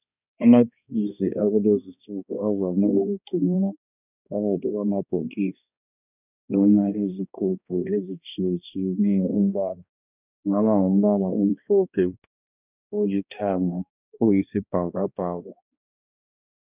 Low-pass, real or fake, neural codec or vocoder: 3.6 kHz; fake; codec, 16 kHz, 1 kbps, X-Codec, HuBERT features, trained on balanced general audio